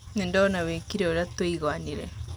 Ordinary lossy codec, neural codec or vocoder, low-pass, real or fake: none; none; none; real